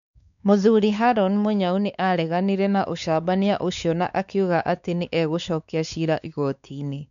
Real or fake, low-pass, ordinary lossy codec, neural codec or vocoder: fake; 7.2 kHz; none; codec, 16 kHz, 4 kbps, X-Codec, WavLM features, trained on Multilingual LibriSpeech